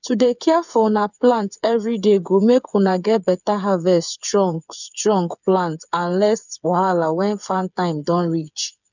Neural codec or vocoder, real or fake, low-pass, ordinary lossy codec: codec, 16 kHz, 8 kbps, FreqCodec, smaller model; fake; 7.2 kHz; none